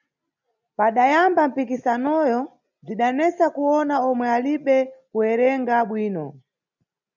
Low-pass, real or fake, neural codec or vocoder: 7.2 kHz; real; none